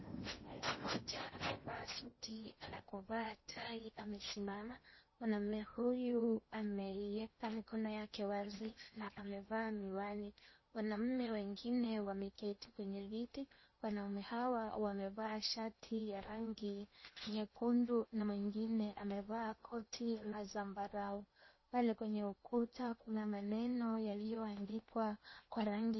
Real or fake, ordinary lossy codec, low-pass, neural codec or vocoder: fake; MP3, 24 kbps; 7.2 kHz; codec, 16 kHz in and 24 kHz out, 0.8 kbps, FocalCodec, streaming, 65536 codes